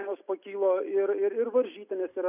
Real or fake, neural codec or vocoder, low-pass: real; none; 3.6 kHz